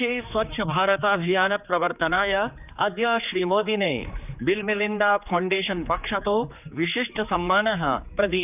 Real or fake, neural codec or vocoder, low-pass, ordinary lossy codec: fake; codec, 16 kHz, 4 kbps, X-Codec, HuBERT features, trained on general audio; 3.6 kHz; none